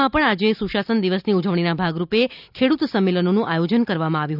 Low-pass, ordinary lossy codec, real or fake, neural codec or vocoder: 5.4 kHz; none; real; none